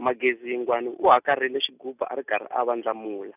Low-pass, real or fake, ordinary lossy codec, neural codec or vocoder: 3.6 kHz; real; none; none